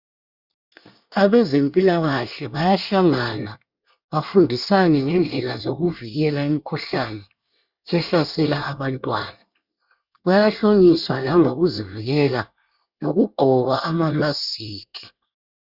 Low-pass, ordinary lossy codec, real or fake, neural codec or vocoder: 5.4 kHz; Opus, 64 kbps; fake; codec, 24 kHz, 1 kbps, SNAC